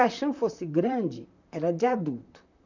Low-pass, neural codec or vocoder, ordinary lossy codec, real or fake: 7.2 kHz; vocoder, 44.1 kHz, 128 mel bands, Pupu-Vocoder; none; fake